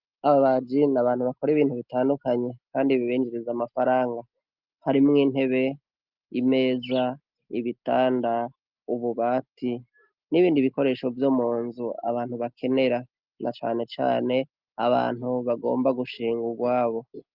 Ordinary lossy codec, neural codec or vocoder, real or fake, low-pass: Opus, 24 kbps; none; real; 5.4 kHz